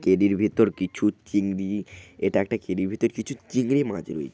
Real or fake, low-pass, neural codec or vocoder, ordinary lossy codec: real; none; none; none